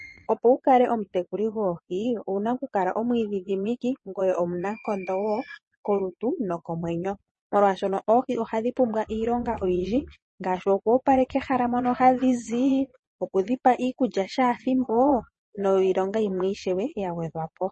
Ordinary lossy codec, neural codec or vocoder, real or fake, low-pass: MP3, 32 kbps; vocoder, 22.05 kHz, 80 mel bands, Vocos; fake; 9.9 kHz